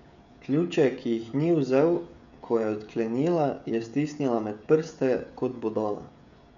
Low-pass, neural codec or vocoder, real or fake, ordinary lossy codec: 7.2 kHz; codec, 16 kHz, 16 kbps, FreqCodec, smaller model; fake; none